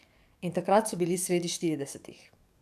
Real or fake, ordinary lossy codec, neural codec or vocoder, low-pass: fake; none; codec, 44.1 kHz, 7.8 kbps, DAC; 14.4 kHz